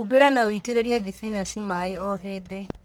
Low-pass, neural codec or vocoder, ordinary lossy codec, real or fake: none; codec, 44.1 kHz, 1.7 kbps, Pupu-Codec; none; fake